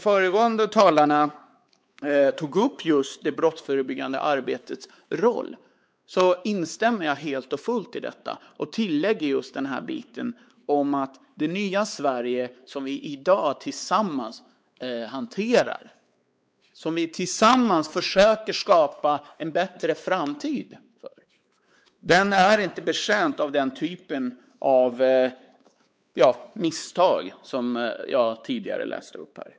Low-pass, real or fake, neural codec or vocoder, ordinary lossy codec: none; fake; codec, 16 kHz, 4 kbps, X-Codec, WavLM features, trained on Multilingual LibriSpeech; none